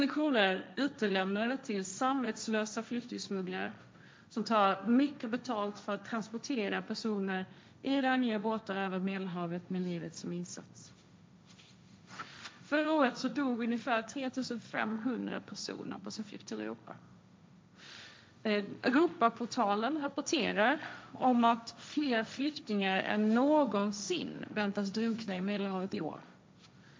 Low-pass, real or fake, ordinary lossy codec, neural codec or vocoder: none; fake; none; codec, 16 kHz, 1.1 kbps, Voila-Tokenizer